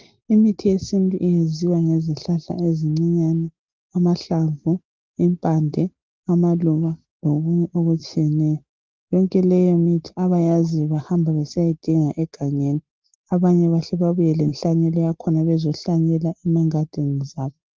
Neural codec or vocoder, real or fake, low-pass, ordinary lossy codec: none; real; 7.2 kHz; Opus, 16 kbps